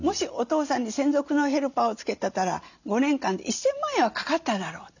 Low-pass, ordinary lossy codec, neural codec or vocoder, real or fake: 7.2 kHz; none; none; real